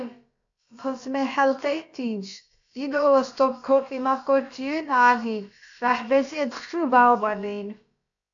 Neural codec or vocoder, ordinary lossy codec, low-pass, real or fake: codec, 16 kHz, about 1 kbps, DyCAST, with the encoder's durations; AAC, 64 kbps; 7.2 kHz; fake